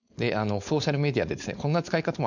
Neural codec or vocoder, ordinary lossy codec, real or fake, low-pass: codec, 16 kHz, 4.8 kbps, FACodec; none; fake; 7.2 kHz